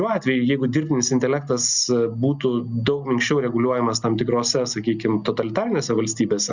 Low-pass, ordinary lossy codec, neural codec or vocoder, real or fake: 7.2 kHz; Opus, 64 kbps; none; real